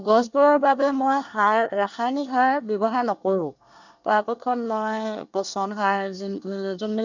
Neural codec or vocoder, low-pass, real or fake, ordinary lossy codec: codec, 24 kHz, 1 kbps, SNAC; 7.2 kHz; fake; none